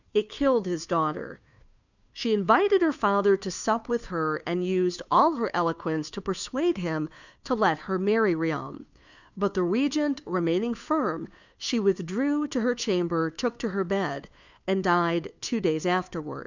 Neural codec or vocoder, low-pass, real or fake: codec, 16 kHz, 2 kbps, FunCodec, trained on Chinese and English, 25 frames a second; 7.2 kHz; fake